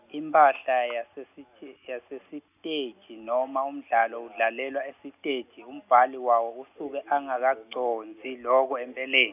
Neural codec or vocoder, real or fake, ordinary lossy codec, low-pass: none; real; none; 3.6 kHz